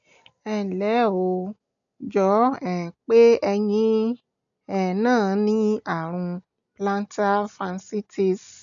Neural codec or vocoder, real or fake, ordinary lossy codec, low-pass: none; real; none; 7.2 kHz